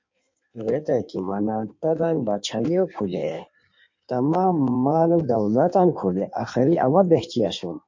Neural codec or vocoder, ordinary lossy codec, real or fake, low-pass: codec, 16 kHz in and 24 kHz out, 1.1 kbps, FireRedTTS-2 codec; MP3, 48 kbps; fake; 7.2 kHz